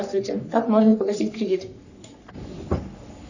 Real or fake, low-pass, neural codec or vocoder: fake; 7.2 kHz; codec, 44.1 kHz, 3.4 kbps, Pupu-Codec